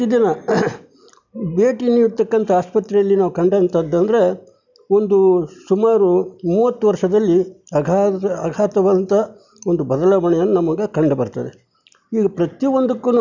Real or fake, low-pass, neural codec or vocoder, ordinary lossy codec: real; 7.2 kHz; none; none